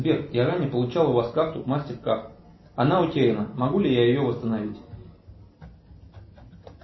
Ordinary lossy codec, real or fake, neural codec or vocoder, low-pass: MP3, 24 kbps; real; none; 7.2 kHz